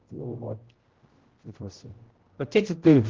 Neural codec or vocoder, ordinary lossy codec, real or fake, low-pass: codec, 16 kHz, 0.5 kbps, X-Codec, HuBERT features, trained on general audio; Opus, 16 kbps; fake; 7.2 kHz